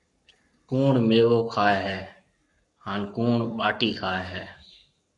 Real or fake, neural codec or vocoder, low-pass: fake; codec, 44.1 kHz, 7.8 kbps, Pupu-Codec; 10.8 kHz